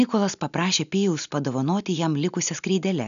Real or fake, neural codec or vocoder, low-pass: real; none; 7.2 kHz